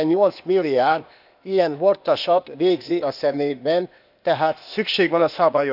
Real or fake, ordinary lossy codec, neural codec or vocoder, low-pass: fake; none; codec, 16 kHz, 0.8 kbps, ZipCodec; 5.4 kHz